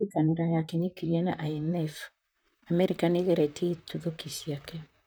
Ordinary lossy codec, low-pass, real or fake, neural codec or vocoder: none; none; fake; vocoder, 44.1 kHz, 128 mel bands, Pupu-Vocoder